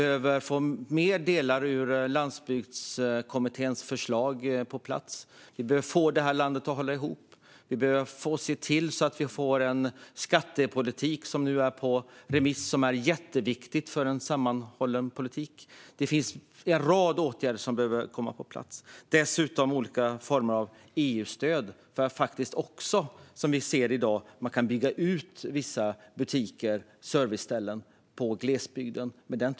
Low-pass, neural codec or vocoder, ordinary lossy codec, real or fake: none; none; none; real